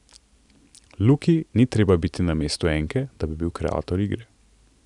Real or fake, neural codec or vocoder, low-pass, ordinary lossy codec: real; none; 10.8 kHz; none